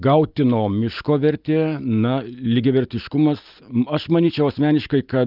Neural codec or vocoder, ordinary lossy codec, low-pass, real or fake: none; Opus, 24 kbps; 5.4 kHz; real